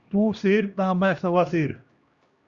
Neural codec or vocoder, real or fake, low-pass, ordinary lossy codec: codec, 16 kHz, 1 kbps, X-Codec, HuBERT features, trained on LibriSpeech; fake; 7.2 kHz; Opus, 64 kbps